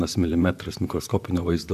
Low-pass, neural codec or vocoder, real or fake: 14.4 kHz; vocoder, 44.1 kHz, 128 mel bands, Pupu-Vocoder; fake